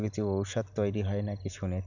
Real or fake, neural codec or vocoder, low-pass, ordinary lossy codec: real; none; 7.2 kHz; none